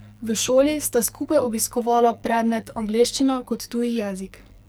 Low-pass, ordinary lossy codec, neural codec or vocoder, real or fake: none; none; codec, 44.1 kHz, 2.6 kbps, SNAC; fake